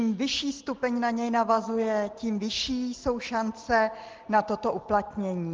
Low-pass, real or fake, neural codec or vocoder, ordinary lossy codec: 7.2 kHz; real; none; Opus, 16 kbps